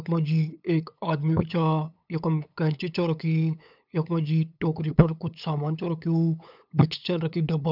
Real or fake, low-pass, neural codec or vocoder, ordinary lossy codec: fake; 5.4 kHz; codec, 16 kHz, 8 kbps, FunCodec, trained on LibriTTS, 25 frames a second; none